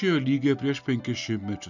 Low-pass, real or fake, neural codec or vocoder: 7.2 kHz; real; none